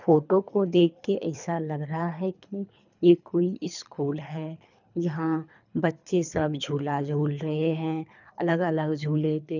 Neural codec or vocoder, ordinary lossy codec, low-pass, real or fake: codec, 24 kHz, 3 kbps, HILCodec; none; 7.2 kHz; fake